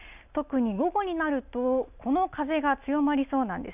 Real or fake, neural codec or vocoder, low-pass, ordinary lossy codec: real; none; 3.6 kHz; none